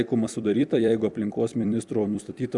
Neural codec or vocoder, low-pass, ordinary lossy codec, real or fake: vocoder, 44.1 kHz, 128 mel bands every 256 samples, BigVGAN v2; 10.8 kHz; Opus, 64 kbps; fake